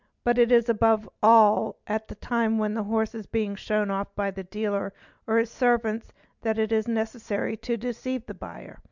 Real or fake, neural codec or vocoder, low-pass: real; none; 7.2 kHz